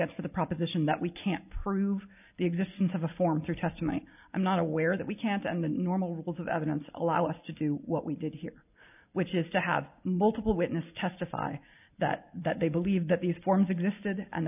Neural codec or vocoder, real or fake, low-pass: none; real; 3.6 kHz